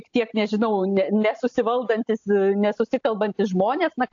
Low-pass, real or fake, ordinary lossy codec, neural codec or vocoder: 7.2 kHz; real; AAC, 48 kbps; none